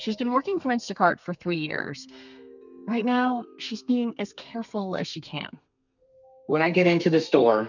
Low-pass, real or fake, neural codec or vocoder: 7.2 kHz; fake; codec, 32 kHz, 1.9 kbps, SNAC